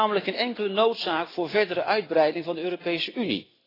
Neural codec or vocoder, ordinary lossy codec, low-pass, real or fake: none; AAC, 24 kbps; 5.4 kHz; real